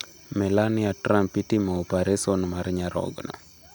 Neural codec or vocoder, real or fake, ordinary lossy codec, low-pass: none; real; none; none